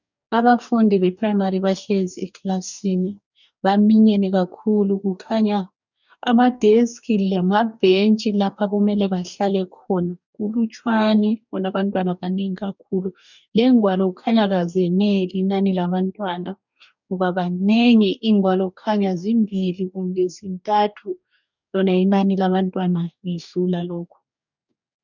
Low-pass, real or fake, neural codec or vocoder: 7.2 kHz; fake; codec, 44.1 kHz, 2.6 kbps, DAC